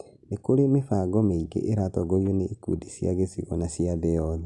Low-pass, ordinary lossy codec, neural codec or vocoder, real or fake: 10.8 kHz; none; none; real